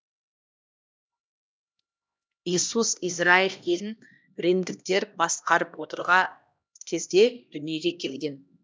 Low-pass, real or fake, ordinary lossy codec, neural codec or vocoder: none; fake; none; codec, 16 kHz, 1 kbps, X-Codec, HuBERT features, trained on LibriSpeech